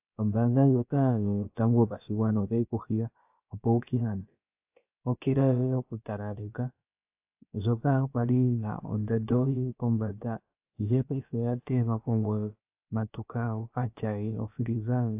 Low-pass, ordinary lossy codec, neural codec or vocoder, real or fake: 3.6 kHz; AAC, 32 kbps; codec, 16 kHz, about 1 kbps, DyCAST, with the encoder's durations; fake